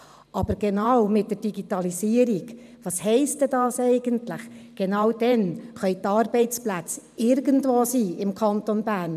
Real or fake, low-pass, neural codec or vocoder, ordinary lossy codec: fake; 14.4 kHz; vocoder, 44.1 kHz, 128 mel bands every 512 samples, BigVGAN v2; none